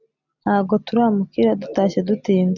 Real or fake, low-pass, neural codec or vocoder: real; 7.2 kHz; none